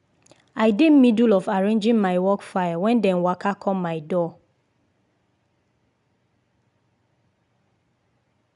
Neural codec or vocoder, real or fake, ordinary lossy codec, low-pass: none; real; MP3, 96 kbps; 10.8 kHz